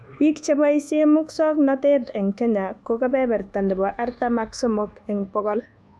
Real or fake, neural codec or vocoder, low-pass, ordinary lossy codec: fake; codec, 24 kHz, 1.2 kbps, DualCodec; none; none